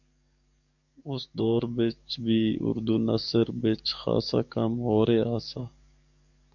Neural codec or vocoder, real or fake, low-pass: codec, 44.1 kHz, 7.8 kbps, DAC; fake; 7.2 kHz